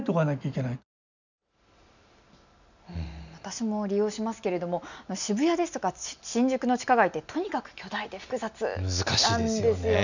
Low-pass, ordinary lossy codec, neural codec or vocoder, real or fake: 7.2 kHz; none; none; real